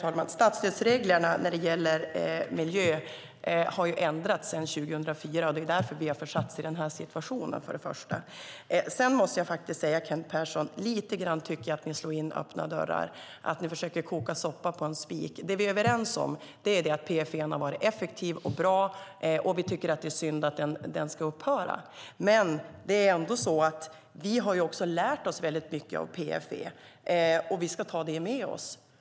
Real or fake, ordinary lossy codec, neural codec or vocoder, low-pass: real; none; none; none